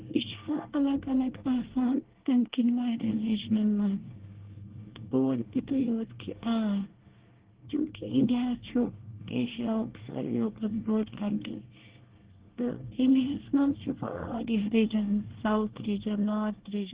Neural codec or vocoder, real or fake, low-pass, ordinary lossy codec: codec, 24 kHz, 1 kbps, SNAC; fake; 3.6 kHz; Opus, 16 kbps